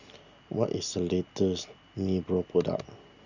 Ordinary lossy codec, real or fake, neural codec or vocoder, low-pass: Opus, 64 kbps; real; none; 7.2 kHz